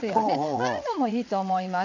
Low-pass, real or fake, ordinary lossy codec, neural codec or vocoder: 7.2 kHz; fake; none; vocoder, 22.05 kHz, 80 mel bands, WaveNeXt